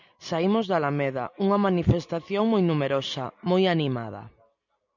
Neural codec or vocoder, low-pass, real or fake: none; 7.2 kHz; real